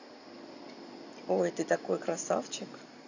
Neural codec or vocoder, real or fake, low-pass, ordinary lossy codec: none; real; 7.2 kHz; none